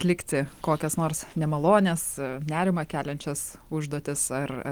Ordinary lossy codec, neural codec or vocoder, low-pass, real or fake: Opus, 24 kbps; none; 19.8 kHz; real